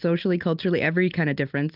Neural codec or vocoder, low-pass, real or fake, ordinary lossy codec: none; 5.4 kHz; real; Opus, 24 kbps